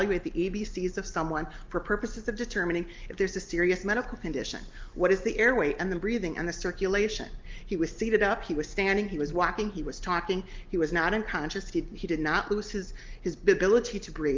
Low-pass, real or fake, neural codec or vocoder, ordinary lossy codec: 7.2 kHz; real; none; Opus, 32 kbps